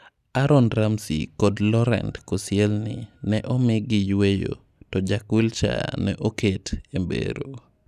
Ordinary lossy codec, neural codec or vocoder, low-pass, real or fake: none; none; 14.4 kHz; real